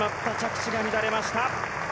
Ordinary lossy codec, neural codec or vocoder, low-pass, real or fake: none; none; none; real